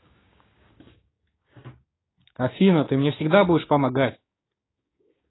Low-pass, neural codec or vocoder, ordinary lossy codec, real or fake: 7.2 kHz; codec, 16 kHz, 6 kbps, DAC; AAC, 16 kbps; fake